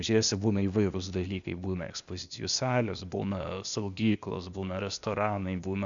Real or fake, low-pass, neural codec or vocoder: fake; 7.2 kHz; codec, 16 kHz, 0.8 kbps, ZipCodec